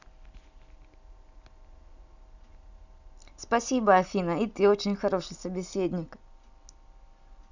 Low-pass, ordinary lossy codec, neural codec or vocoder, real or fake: 7.2 kHz; none; none; real